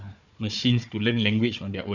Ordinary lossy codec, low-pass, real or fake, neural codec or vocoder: none; 7.2 kHz; fake; codec, 16 kHz, 4 kbps, FunCodec, trained on Chinese and English, 50 frames a second